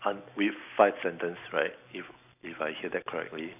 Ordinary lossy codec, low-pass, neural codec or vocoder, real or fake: none; 3.6 kHz; none; real